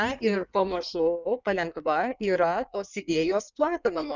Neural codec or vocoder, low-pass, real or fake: codec, 16 kHz in and 24 kHz out, 1.1 kbps, FireRedTTS-2 codec; 7.2 kHz; fake